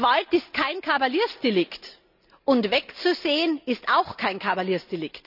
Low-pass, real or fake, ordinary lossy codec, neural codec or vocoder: 5.4 kHz; real; none; none